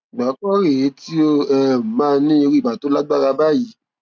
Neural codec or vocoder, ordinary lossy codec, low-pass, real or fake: none; none; none; real